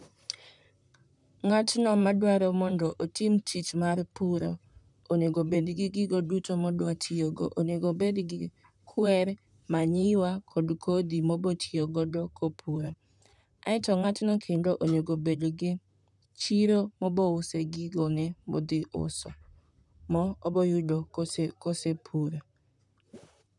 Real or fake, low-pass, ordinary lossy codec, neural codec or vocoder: fake; 10.8 kHz; none; vocoder, 44.1 kHz, 128 mel bands, Pupu-Vocoder